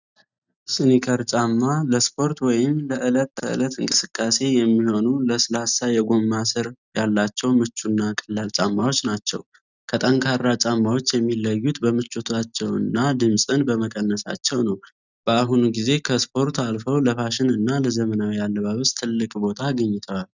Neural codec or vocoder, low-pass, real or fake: none; 7.2 kHz; real